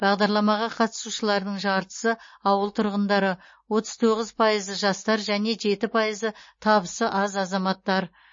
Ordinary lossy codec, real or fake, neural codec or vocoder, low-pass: MP3, 32 kbps; real; none; 7.2 kHz